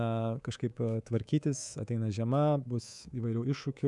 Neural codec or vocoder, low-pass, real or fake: codec, 24 kHz, 3.1 kbps, DualCodec; 10.8 kHz; fake